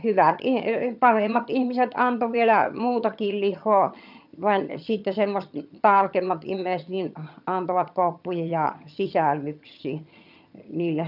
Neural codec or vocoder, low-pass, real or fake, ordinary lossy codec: vocoder, 22.05 kHz, 80 mel bands, HiFi-GAN; 5.4 kHz; fake; none